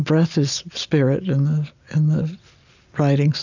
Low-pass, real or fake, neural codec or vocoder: 7.2 kHz; real; none